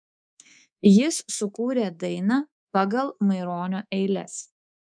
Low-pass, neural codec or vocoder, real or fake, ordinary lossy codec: 9.9 kHz; codec, 24 kHz, 3.1 kbps, DualCodec; fake; MP3, 96 kbps